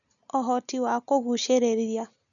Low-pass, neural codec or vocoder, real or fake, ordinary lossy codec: 7.2 kHz; none; real; none